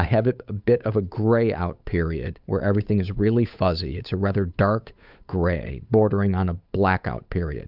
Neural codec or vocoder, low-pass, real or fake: codec, 16 kHz, 8 kbps, FunCodec, trained on Chinese and English, 25 frames a second; 5.4 kHz; fake